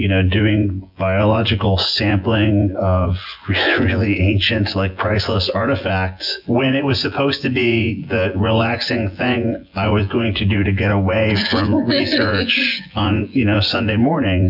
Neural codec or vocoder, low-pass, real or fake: vocoder, 24 kHz, 100 mel bands, Vocos; 5.4 kHz; fake